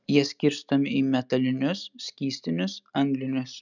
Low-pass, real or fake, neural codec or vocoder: 7.2 kHz; real; none